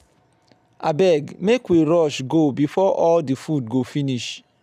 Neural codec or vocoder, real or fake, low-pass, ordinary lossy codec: none; real; 14.4 kHz; none